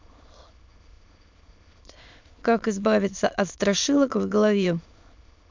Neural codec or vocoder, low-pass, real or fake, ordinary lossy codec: autoencoder, 22.05 kHz, a latent of 192 numbers a frame, VITS, trained on many speakers; 7.2 kHz; fake; MP3, 64 kbps